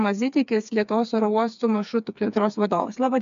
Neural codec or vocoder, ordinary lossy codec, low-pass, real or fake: codec, 16 kHz, 4 kbps, FreqCodec, smaller model; MP3, 64 kbps; 7.2 kHz; fake